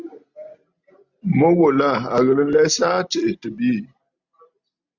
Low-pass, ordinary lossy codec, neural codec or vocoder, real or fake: 7.2 kHz; Opus, 64 kbps; none; real